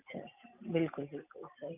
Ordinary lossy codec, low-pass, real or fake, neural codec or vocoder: none; 3.6 kHz; real; none